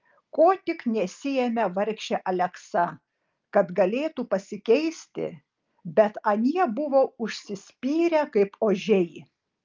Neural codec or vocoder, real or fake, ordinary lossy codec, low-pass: none; real; Opus, 24 kbps; 7.2 kHz